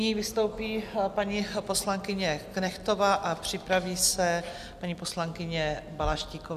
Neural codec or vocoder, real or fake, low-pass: none; real; 14.4 kHz